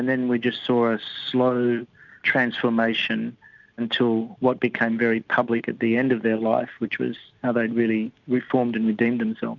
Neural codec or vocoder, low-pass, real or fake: none; 7.2 kHz; real